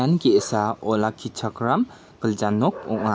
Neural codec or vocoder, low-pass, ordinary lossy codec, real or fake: none; none; none; real